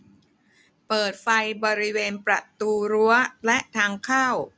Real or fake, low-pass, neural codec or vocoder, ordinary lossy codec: real; none; none; none